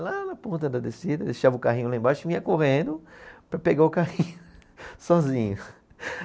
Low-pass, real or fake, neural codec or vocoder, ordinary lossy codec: none; real; none; none